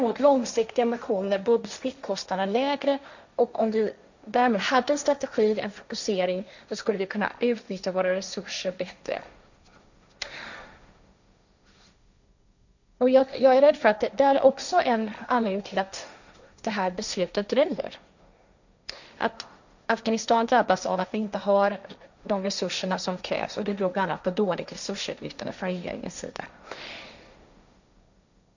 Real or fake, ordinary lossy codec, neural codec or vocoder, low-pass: fake; none; codec, 16 kHz, 1.1 kbps, Voila-Tokenizer; 7.2 kHz